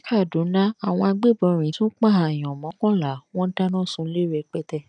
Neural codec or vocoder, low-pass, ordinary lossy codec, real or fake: none; 9.9 kHz; none; real